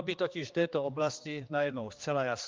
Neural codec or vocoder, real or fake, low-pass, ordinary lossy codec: codec, 16 kHz, 2 kbps, X-Codec, HuBERT features, trained on general audio; fake; 7.2 kHz; Opus, 32 kbps